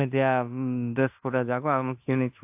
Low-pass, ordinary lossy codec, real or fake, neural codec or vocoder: 3.6 kHz; AAC, 32 kbps; fake; codec, 16 kHz in and 24 kHz out, 0.9 kbps, LongCat-Audio-Codec, fine tuned four codebook decoder